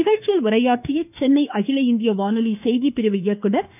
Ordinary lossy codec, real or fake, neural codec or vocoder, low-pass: none; fake; autoencoder, 48 kHz, 32 numbers a frame, DAC-VAE, trained on Japanese speech; 3.6 kHz